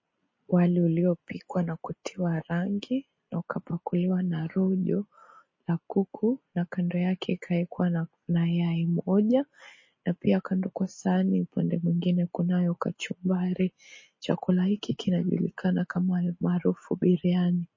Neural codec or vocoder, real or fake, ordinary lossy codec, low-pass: none; real; MP3, 32 kbps; 7.2 kHz